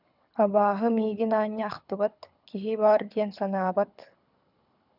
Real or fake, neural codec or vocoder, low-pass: fake; codec, 24 kHz, 6 kbps, HILCodec; 5.4 kHz